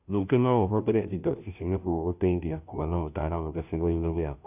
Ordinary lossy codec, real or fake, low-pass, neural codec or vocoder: none; fake; 3.6 kHz; codec, 16 kHz, 0.5 kbps, FunCodec, trained on Chinese and English, 25 frames a second